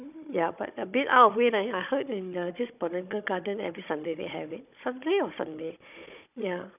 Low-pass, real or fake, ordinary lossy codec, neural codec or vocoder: 3.6 kHz; fake; none; codec, 16 kHz, 16 kbps, FunCodec, trained on Chinese and English, 50 frames a second